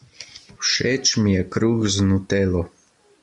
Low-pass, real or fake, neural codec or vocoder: 10.8 kHz; real; none